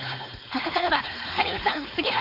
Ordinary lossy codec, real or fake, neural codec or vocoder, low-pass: none; fake; codec, 16 kHz, 4.8 kbps, FACodec; 5.4 kHz